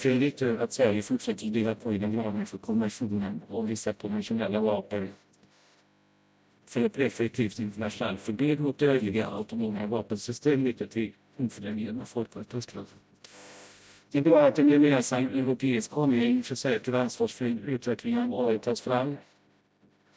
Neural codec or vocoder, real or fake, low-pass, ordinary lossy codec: codec, 16 kHz, 0.5 kbps, FreqCodec, smaller model; fake; none; none